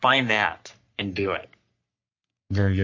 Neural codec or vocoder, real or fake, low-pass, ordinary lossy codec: codec, 44.1 kHz, 3.4 kbps, Pupu-Codec; fake; 7.2 kHz; MP3, 48 kbps